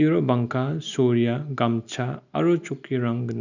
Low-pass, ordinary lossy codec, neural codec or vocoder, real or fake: 7.2 kHz; none; none; real